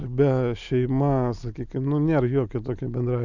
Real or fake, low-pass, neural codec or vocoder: real; 7.2 kHz; none